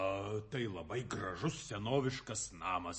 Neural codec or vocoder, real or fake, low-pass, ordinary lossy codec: none; real; 10.8 kHz; MP3, 32 kbps